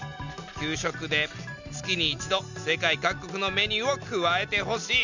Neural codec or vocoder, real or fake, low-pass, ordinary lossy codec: none; real; 7.2 kHz; none